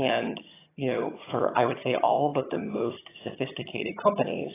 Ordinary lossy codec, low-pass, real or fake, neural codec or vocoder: AAC, 16 kbps; 3.6 kHz; fake; vocoder, 22.05 kHz, 80 mel bands, HiFi-GAN